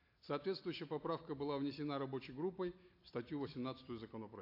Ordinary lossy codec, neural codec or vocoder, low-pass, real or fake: AAC, 32 kbps; none; 5.4 kHz; real